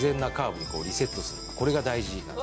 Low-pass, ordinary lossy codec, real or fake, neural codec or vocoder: none; none; real; none